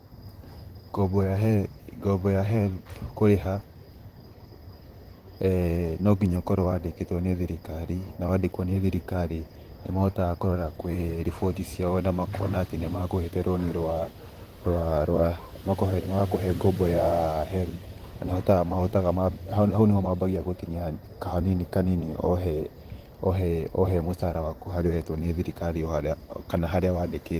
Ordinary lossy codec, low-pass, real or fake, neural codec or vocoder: Opus, 24 kbps; 14.4 kHz; fake; vocoder, 44.1 kHz, 128 mel bands, Pupu-Vocoder